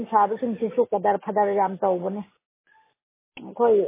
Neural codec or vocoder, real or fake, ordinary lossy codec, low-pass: none; real; MP3, 16 kbps; 3.6 kHz